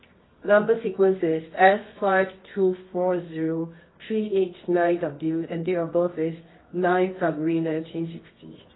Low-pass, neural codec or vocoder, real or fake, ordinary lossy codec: 7.2 kHz; codec, 24 kHz, 0.9 kbps, WavTokenizer, medium music audio release; fake; AAC, 16 kbps